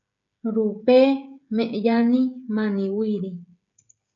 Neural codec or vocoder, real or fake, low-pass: codec, 16 kHz, 16 kbps, FreqCodec, smaller model; fake; 7.2 kHz